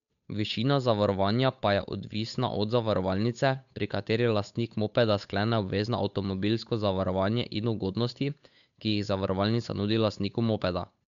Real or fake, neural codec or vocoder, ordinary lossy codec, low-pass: fake; codec, 16 kHz, 8 kbps, FunCodec, trained on Chinese and English, 25 frames a second; none; 7.2 kHz